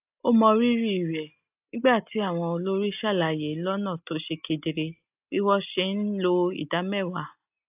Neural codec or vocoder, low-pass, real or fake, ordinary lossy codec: none; 3.6 kHz; real; AAC, 32 kbps